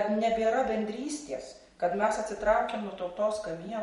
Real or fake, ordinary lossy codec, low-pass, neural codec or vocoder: fake; MP3, 48 kbps; 19.8 kHz; vocoder, 44.1 kHz, 128 mel bands every 512 samples, BigVGAN v2